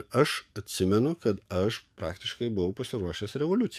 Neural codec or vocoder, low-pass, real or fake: codec, 44.1 kHz, 7.8 kbps, DAC; 14.4 kHz; fake